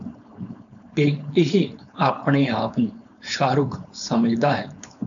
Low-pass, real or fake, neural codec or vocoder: 7.2 kHz; fake; codec, 16 kHz, 4.8 kbps, FACodec